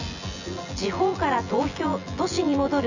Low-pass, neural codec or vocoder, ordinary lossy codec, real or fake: 7.2 kHz; vocoder, 24 kHz, 100 mel bands, Vocos; none; fake